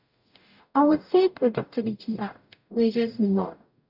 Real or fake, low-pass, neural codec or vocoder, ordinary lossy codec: fake; 5.4 kHz; codec, 44.1 kHz, 0.9 kbps, DAC; none